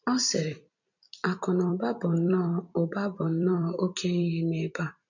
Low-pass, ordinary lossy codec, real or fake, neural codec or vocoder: 7.2 kHz; none; fake; vocoder, 44.1 kHz, 128 mel bands every 256 samples, BigVGAN v2